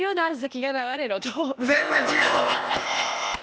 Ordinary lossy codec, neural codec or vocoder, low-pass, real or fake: none; codec, 16 kHz, 0.8 kbps, ZipCodec; none; fake